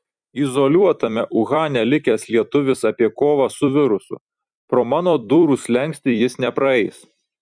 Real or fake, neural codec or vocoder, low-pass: fake; vocoder, 44.1 kHz, 128 mel bands every 256 samples, BigVGAN v2; 9.9 kHz